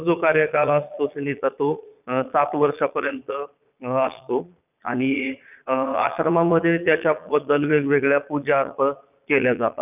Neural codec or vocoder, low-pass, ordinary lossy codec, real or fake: vocoder, 22.05 kHz, 80 mel bands, Vocos; 3.6 kHz; none; fake